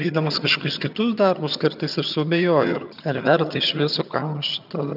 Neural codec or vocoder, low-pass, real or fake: vocoder, 22.05 kHz, 80 mel bands, HiFi-GAN; 5.4 kHz; fake